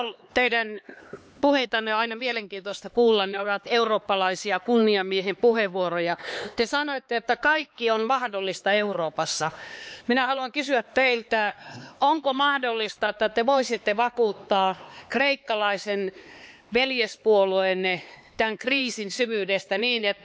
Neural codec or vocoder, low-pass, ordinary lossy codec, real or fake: codec, 16 kHz, 4 kbps, X-Codec, HuBERT features, trained on LibriSpeech; none; none; fake